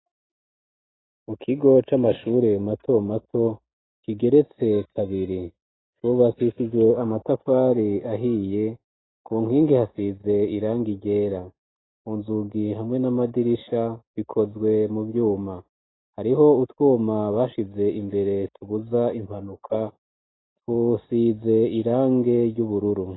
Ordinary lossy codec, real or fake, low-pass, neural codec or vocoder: AAC, 16 kbps; real; 7.2 kHz; none